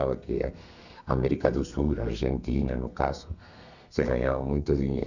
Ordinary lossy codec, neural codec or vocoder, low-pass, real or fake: none; codec, 32 kHz, 1.9 kbps, SNAC; 7.2 kHz; fake